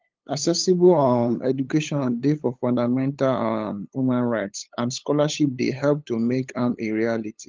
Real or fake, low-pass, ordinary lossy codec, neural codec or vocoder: fake; 7.2 kHz; Opus, 32 kbps; codec, 16 kHz, 8 kbps, FunCodec, trained on LibriTTS, 25 frames a second